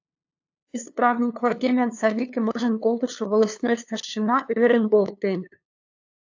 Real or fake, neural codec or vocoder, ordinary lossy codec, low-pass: fake; codec, 16 kHz, 2 kbps, FunCodec, trained on LibriTTS, 25 frames a second; AAC, 48 kbps; 7.2 kHz